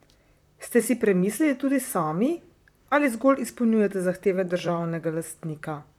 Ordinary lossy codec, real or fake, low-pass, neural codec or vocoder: none; fake; 19.8 kHz; vocoder, 44.1 kHz, 128 mel bands, Pupu-Vocoder